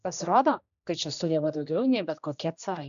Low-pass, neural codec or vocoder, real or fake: 7.2 kHz; codec, 16 kHz, 1 kbps, X-Codec, HuBERT features, trained on general audio; fake